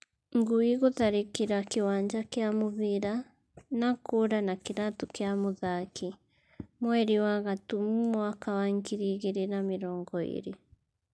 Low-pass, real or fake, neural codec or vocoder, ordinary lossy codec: none; real; none; none